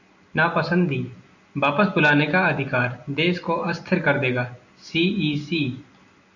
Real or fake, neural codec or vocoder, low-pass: real; none; 7.2 kHz